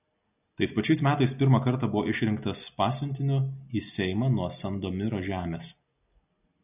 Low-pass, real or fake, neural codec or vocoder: 3.6 kHz; real; none